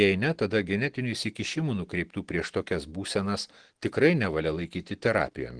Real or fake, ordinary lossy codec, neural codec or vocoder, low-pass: real; Opus, 16 kbps; none; 9.9 kHz